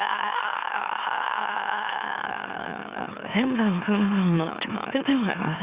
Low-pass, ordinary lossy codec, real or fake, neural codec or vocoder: 3.6 kHz; Opus, 64 kbps; fake; autoencoder, 44.1 kHz, a latent of 192 numbers a frame, MeloTTS